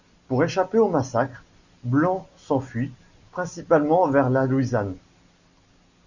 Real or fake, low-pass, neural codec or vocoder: real; 7.2 kHz; none